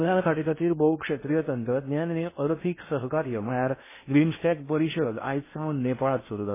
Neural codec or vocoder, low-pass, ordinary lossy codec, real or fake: codec, 16 kHz in and 24 kHz out, 0.6 kbps, FocalCodec, streaming, 2048 codes; 3.6 kHz; MP3, 16 kbps; fake